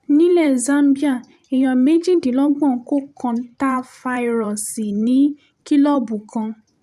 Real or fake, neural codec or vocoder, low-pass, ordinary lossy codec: fake; vocoder, 44.1 kHz, 128 mel bands every 512 samples, BigVGAN v2; 14.4 kHz; none